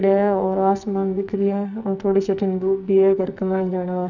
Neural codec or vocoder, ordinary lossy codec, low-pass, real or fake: codec, 44.1 kHz, 2.6 kbps, SNAC; none; 7.2 kHz; fake